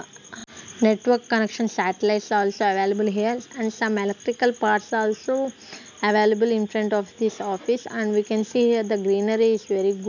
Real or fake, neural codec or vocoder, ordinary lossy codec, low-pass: real; none; none; 7.2 kHz